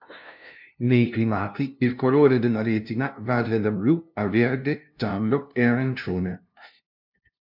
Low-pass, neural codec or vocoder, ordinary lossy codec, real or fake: 5.4 kHz; codec, 16 kHz, 0.5 kbps, FunCodec, trained on LibriTTS, 25 frames a second; MP3, 48 kbps; fake